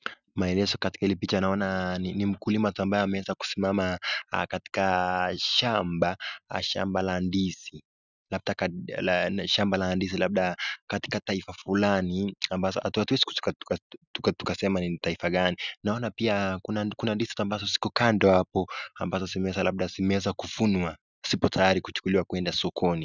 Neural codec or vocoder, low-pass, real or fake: none; 7.2 kHz; real